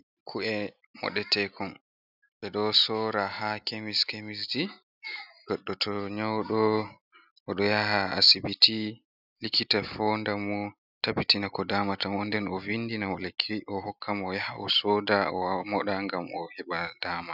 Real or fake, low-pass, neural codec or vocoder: real; 5.4 kHz; none